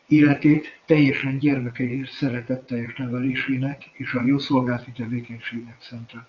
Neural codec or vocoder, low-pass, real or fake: vocoder, 22.05 kHz, 80 mel bands, WaveNeXt; 7.2 kHz; fake